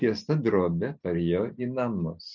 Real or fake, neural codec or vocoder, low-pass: real; none; 7.2 kHz